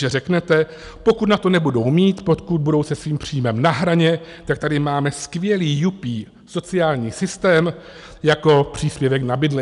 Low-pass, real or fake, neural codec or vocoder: 10.8 kHz; real; none